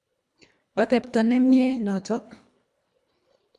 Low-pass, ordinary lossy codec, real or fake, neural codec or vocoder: none; none; fake; codec, 24 kHz, 1.5 kbps, HILCodec